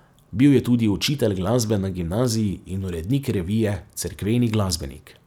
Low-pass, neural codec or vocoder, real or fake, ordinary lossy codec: 19.8 kHz; none; real; none